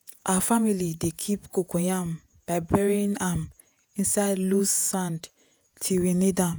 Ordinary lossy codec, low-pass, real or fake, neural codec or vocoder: none; none; fake; vocoder, 48 kHz, 128 mel bands, Vocos